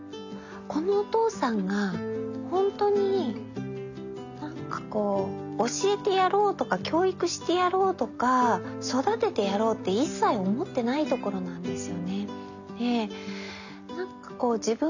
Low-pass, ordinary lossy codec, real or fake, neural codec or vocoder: 7.2 kHz; none; real; none